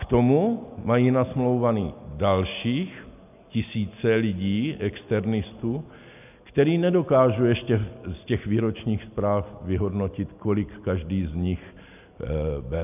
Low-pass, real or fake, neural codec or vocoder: 3.6 kHz; real; none